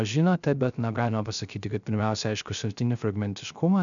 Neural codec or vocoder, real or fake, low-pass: codec, 16 kHz, 0.3 kbps, FocalCodec; fake; 7.2 kHz